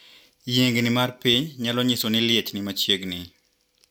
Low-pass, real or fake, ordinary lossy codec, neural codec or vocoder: 19.8 kHz; real; none; none